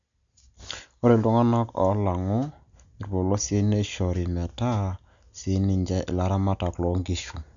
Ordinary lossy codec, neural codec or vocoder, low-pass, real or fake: none; none; 7.2 kHz; real